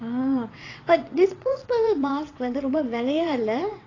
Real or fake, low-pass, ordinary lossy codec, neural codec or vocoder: fake; 7.2 kHz; AAC, 32 kbps; vocoder, 22.05 kHz, 80 mel bands, WaveNeXt